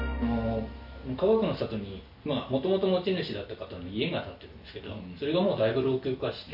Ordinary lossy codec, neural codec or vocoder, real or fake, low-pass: AAC, 48 kbps; none; real; 5.4 kHz